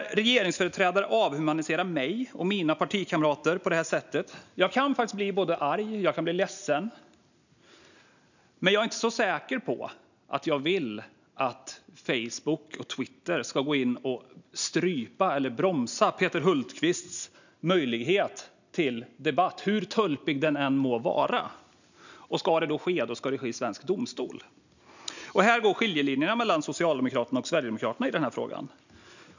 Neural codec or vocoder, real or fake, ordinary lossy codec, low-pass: none; real; none; 7.2 kHz